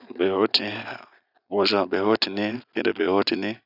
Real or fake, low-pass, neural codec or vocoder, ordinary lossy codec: real; 5.4 kHz; none; none